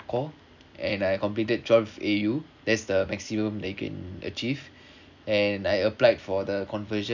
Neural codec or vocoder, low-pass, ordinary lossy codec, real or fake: none; 7.2 kHz; none; real